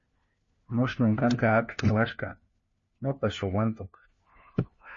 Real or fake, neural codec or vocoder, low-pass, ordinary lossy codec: fake; codec, 16 kHz, 1 kbps, FunCodec, trained on LibriTTS, 50 frames a second; 7.2 kHz; MP3, 32 kbps